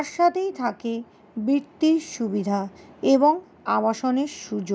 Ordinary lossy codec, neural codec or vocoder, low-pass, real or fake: none; none; none; real